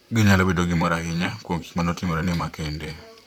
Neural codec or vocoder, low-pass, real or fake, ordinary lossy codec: vocoder, 44.1 kHz, 128 mel bands, Pupu-Vocoder; 19.8 kHz; fake; none